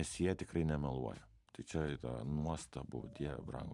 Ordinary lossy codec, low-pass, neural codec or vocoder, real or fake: AAC, 64 kbps; 10.8 kHz; vocoder, 44.1 kHz, 128 mel bands every 512 samples, BigVGAN v2; fake